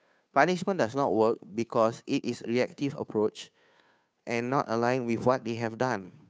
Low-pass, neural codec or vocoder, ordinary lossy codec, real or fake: none; codec, 16 kHz, 2 kbps, FunCodec, trained on Chinese and English, 25 frames a second; none; fake